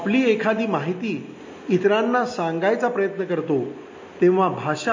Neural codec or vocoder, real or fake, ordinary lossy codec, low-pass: none; real; MP3, 32 kbps; 7.2 kHz